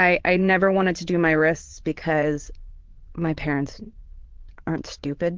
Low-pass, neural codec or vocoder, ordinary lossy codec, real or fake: 7.2 kHz; vocoder, 22.05 kHz, 80 mel bands, Vocos; Opus, 16 kbps; fake